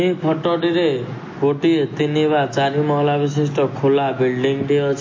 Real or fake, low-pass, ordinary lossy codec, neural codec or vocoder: real; 7.2 kHz; MP3, 32 kbps; none